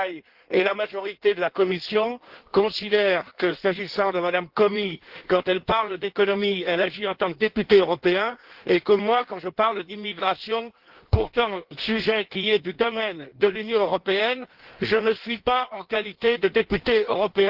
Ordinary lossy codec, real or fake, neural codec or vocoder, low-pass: Opus, 16 kbps; fake; codec, 16 kHz in and 24 kHz out, 1.1 kbps, FireRedTTS-2 codec; 5.4 kHz